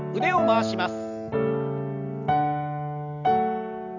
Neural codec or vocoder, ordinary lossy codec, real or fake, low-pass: none; none; real; 7.2 kHz